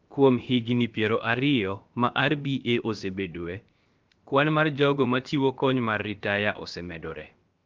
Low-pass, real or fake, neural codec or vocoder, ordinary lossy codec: 7.2 kHz; fake; codec, 16 kHz, about 1 kbps, DyCAST, with the encoder's durations; Opus, 32 kbps